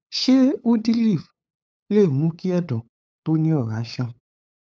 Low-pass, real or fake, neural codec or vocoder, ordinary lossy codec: none; fake; codec, 16 kHz, 8 kbps, FunCodec, trained on LibriTTS, 25 frames a second; none